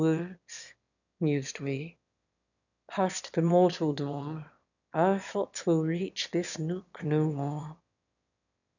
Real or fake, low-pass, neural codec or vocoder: fake; 7.2 kHz; autoencoder, 22.05 kHz, a latent of 192 numbers a frame, VITS, trained on one speaker